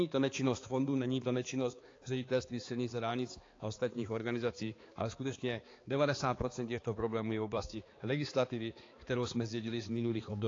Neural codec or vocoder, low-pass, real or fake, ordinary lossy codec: codec, 16 kHz, 4 kbps, X-Codec, HuBERT features, trained on balanced general audio; 7.2 kHz; fake; AAC, 32 kbps